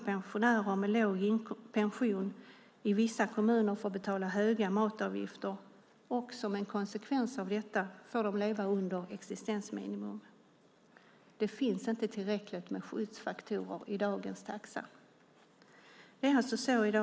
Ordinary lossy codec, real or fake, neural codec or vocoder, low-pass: none; real; none; none